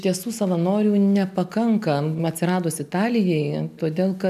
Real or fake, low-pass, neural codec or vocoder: real; 14.4 kHz; none